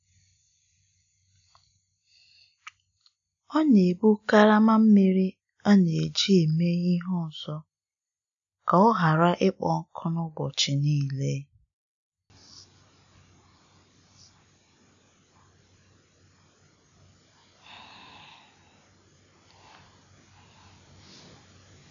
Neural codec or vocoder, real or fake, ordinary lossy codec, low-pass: none; real; MP3, 96 kbps; 7.2 kHz